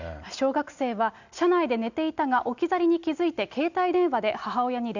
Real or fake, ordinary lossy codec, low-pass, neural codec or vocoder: real; none; 7.2 kHz; none